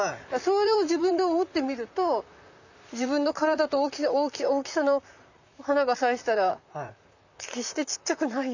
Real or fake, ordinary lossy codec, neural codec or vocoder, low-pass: fake; none; vocoder, 44.1 kHz, 128 mel bands, Pupu-Vocoder; 7.2 kHz